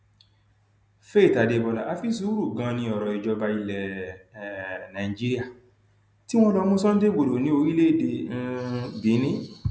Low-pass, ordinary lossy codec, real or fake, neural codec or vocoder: none; none; real; none